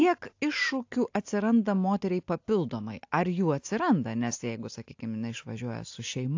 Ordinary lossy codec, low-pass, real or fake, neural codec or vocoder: AAC, 48 kbps; 7.2 kHz; real; none